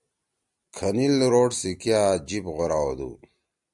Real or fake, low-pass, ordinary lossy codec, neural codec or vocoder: real; 10.8 kHz; MP3, 96 kbps; none